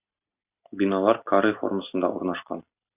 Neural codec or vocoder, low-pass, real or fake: none; 3.6 kHz; real